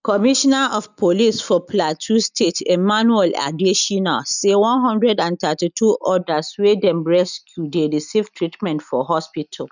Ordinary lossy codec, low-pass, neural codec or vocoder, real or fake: none; 7.2 kHz; none; real